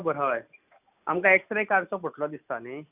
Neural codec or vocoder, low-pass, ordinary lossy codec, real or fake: none; 3.6 kHz; none; real